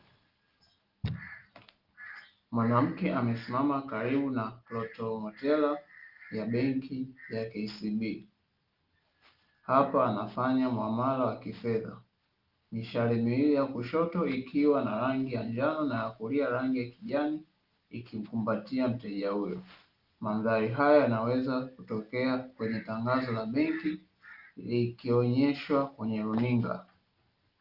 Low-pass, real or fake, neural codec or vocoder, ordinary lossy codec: 5.4 kHz; real; none; Opus, 32 kbps